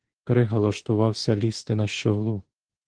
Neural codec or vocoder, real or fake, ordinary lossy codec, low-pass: autoencoder, 48 kHz, 32 numbers a frame, DAC-VAE, trained on Japanese speech; fake; Opus, 16 kbps; 9.9 kHz